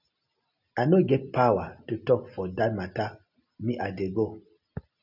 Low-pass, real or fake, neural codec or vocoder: 5.4 kHz; real; none